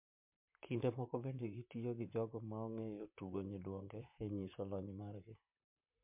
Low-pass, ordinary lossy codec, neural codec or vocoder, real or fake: 3.6 kHz; MP3, 32 kbps; vocoder, 44.1 kHz, 128 mel bands, Pupu-Vocoder; fake